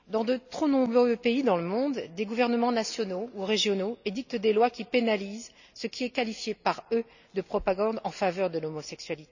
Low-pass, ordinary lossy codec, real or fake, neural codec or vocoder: 7.2 kHz; none; real; none